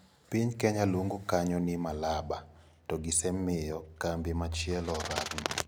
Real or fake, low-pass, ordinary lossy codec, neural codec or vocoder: real; none; none; none